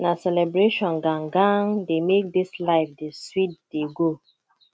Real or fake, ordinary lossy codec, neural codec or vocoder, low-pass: real; none; none; none